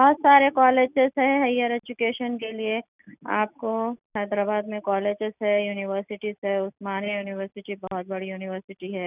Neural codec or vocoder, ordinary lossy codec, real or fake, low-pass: none; none; real; 3.6 kHz